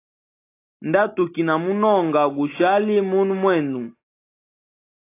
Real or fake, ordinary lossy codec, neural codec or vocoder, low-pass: real; AAC, 24 kbps; none; 3.6 kHz